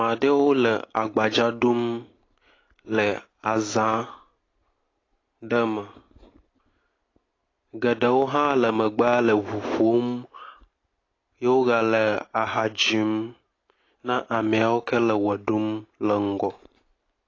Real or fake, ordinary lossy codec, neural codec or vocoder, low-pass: real; AAC, 32 kbps; none; 7.2 kHz